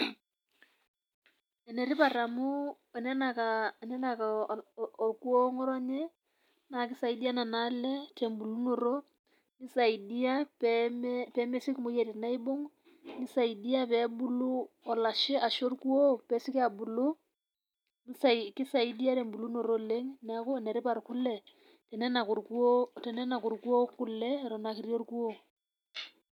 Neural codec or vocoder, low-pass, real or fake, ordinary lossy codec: none; 19.8 kHz; real; none